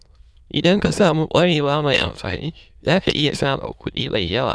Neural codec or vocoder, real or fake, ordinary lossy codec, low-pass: autoencoder, 22.05 kHz, a latent of 192 numbers a frame, VITS, trained on many speakers; fake; none; none